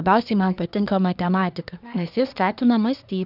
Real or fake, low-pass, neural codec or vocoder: fake; 5.4 kHz; codec, 24 kHz, 1 kbps, SNAC